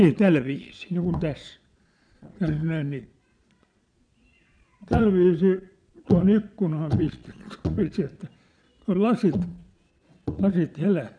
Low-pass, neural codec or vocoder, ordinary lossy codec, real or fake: 9.9 kHz; vocoder, 22.05 kHz, 80 mel bands, Vocos; none; fake